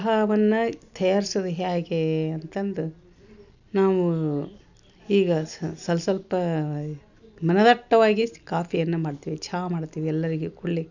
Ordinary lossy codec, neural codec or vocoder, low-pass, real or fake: none; none; 7.2 kHz; real